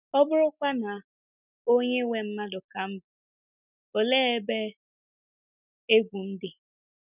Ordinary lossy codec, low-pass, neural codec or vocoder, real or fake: none; 3.6 kHz; none; real